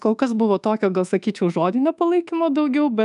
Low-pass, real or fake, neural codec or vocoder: 10.8 kHz; fake; codec, 24 kHz, 1.2 kbps, DualCodec